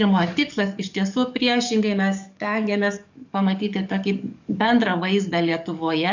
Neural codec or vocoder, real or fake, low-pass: codec, 44.1 kHz, 7.8 kbps, DAC; fake; 7.2 kHz